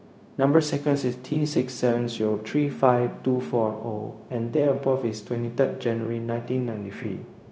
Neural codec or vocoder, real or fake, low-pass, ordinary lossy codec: codec, 16 kHz, 0.4 kbps, LongCat-Audio-Codec; fake; none; none